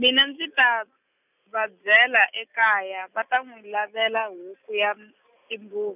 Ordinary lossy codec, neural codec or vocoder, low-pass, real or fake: none; none; 3.6 kHz; real